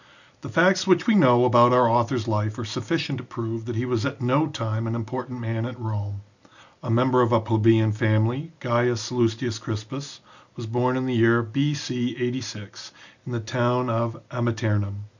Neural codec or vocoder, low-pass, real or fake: none; 7.2 kHz; real